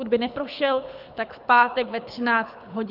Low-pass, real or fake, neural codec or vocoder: 5.4 kHz; fake; codec, 24 kHz, 6 kbps, HILCodec